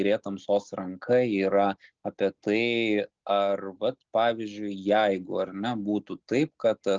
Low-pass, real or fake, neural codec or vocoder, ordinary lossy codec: 7.2 kHz; real; none; Opus, 16 kbps